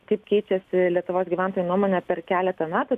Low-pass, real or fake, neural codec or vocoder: 14.4 kHz; real; none